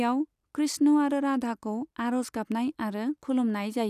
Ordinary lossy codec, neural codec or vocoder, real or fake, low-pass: none; none; real; 14.4 kHz